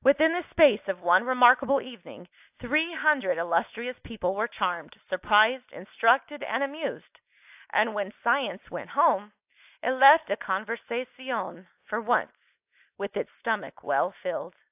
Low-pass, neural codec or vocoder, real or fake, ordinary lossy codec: 3.6 kHz; none; real; AAC, 32 kbps